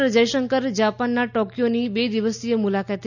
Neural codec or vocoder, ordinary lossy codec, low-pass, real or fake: none; none; 7.2 kHz; real